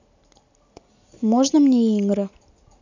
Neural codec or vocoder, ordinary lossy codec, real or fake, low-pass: none; none; real; 7.2 kHz